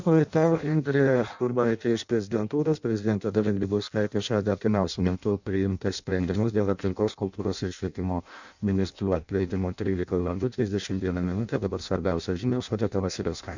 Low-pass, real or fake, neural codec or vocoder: 7.2 kHz; fake; codec, 16 kHz in and 24 kHz out, 0.6 kbps, FireRedTTS-2 codec